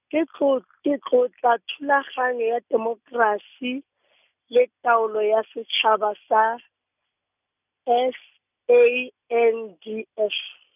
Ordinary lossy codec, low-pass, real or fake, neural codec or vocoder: none; 3.6 kHz; real; none